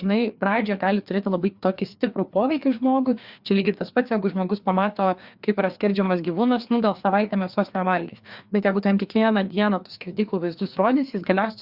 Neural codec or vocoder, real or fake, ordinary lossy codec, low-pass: codec, 16 kHz, 2 kbps, FreqCodec, larger model; fake; Opus, 64 kbps; 5.4 kHz